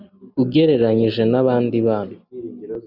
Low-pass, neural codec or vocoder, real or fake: 5.4 kHz; none; real